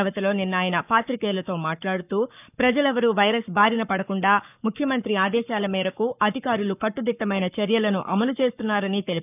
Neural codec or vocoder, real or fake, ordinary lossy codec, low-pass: codec, 44.1 kHz, 7.8 kbps, Pupu-Codec; fake; none; 3.6 kHz